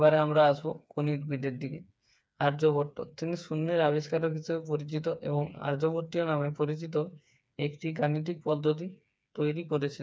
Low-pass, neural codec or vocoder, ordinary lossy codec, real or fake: none; codec, 16 kHz, 4 kbps, FreqCodec, smaller model; none; fake